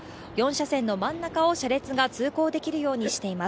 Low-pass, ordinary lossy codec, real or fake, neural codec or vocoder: none; none; real; none